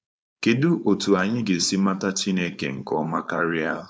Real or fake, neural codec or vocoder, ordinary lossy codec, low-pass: fake; codec, 16 kHz, 4.8 kbps, FACodec; none; none